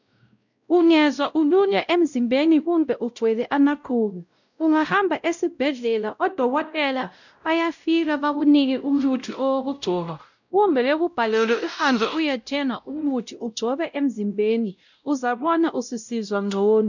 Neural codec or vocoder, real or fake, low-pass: codec, 16 kHz, 0.5 kbps, X-Codec, WavLM features, trained on Multilingual LibriSpeech; fake; 7.2 kHz